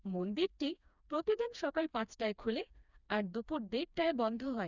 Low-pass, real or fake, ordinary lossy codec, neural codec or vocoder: 7.2 kHz; fake; none; codec, 16 kHz, 2 kbps, FreqCodec, smaller model